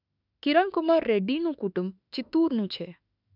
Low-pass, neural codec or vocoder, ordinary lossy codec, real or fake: 5.4 kHz; autoencoder, 48 kHz, 32 numbers a frame, DAC-VAE, trained on Japanese speech; none; fake